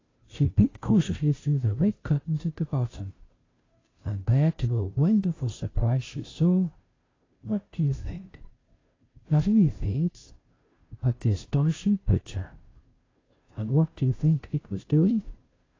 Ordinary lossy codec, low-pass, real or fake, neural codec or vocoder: AAC, 32 kbps; 7.2 kHz; fake; codec, 16 kHz, 0.5 kbps, FunCodec, trained on Chinese and English, 25 frames a second